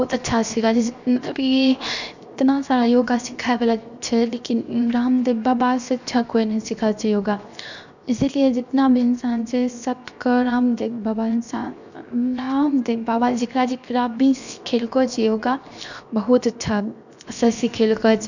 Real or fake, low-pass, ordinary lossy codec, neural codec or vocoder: fake; 7.2 kHz; none; codec, 16 kHz, 0.7 kbps, FocalCodec